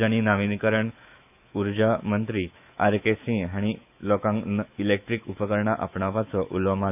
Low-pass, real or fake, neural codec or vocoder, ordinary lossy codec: 3.6 kHz; fake; autoencoder, 48 kHz, 128 numbers a frame, DAC-VAE, trained on Japanese speech; none